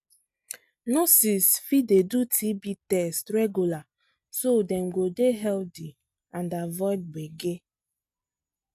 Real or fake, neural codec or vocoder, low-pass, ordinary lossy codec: real; none; 14.4 kHz; none